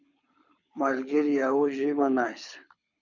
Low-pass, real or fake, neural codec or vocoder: 7.2 kHz; fake; codec, 24 kHz, 6 kbps, HILCodec